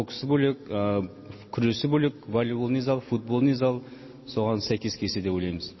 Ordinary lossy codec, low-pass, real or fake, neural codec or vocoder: MP3, 24 kbps; 7.2 kHz; real; none